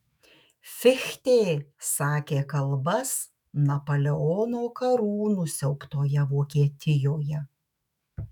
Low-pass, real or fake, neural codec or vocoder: 19.8 kHz; fake; autoencoder, 48 kHz, 128 numbers a frame, DAC-VAE, trained on Japanese speech